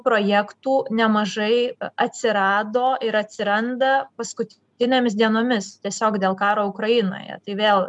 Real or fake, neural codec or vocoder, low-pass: real; none; 10.8 kHz